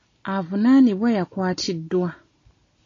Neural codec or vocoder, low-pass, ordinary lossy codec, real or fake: none; 7.2 kHz; AAC, 32 kbps; real